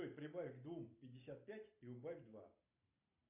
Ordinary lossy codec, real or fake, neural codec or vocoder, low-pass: AAC, 32 kbps; real; none; 3.6 kHz